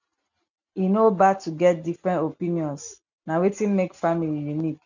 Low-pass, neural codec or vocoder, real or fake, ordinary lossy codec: 7.2 kHz; none; real; none